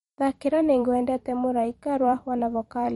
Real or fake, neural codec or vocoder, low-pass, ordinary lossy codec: fake; vocoder, 44.1 kHz, 128 mel bands every 256 samples, BigVGAN v2; 19.8 kHz; MP3, 48 kbps